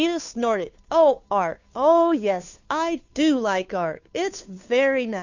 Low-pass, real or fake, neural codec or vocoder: 7.2 kHz; fake; codec, 16 kHz, 2 kbps, FunCodec, trained on Chinese and English, 25 frames a second